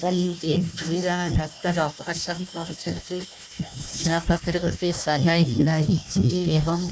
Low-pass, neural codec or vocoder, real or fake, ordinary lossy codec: none; codec, 16 kHz, 1 kbps, FunCodec, trained on Chinese and English, 50 frames a second; fake; none